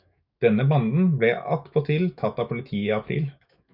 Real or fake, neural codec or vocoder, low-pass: fake; autoencoder, 48 kHz, 128 numbers a frame, DAC-VAE, trained on Japanese speech; 5.4 kHz